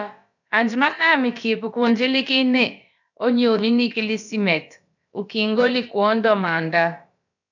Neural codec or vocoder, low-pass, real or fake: codec, 16 kHz, about 1 kbps, DyCAST, with the encoder's durations; 7.2 kHz; fake